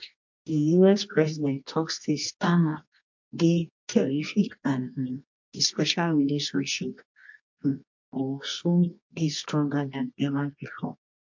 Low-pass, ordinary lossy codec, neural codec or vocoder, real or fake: 7.2 kHz; MP3, 48 kbps; codec, 24 kHz, 0.9 kbps, WavTokenizer, medium music audio release; fake